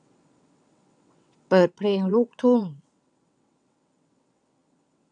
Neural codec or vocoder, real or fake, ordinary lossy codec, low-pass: vocoder, 22.05 kHz, 80 mel bands, WaveNeXt; fake; none; 9.9 kHz